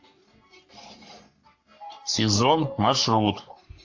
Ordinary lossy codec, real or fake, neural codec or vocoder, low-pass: AAC, 48 kbps; fake; codec, 44.1 kHz, 3.4 kbps, Pupu-Codec; 7.2 kHz